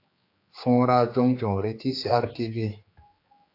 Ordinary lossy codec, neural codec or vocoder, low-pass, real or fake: AAC, 32 kbps; codec, 16 kHz, 4 kbps, X-Codec, HuBERT features, trained on general audio; 5.4 kHz; fake